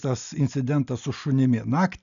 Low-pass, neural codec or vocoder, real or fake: 7.2 kHz; none; real